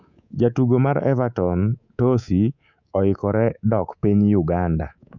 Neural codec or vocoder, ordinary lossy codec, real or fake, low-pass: codec, 24 kHz, 3.1 kbps, DualCodec; none; fake; 7.2 kHz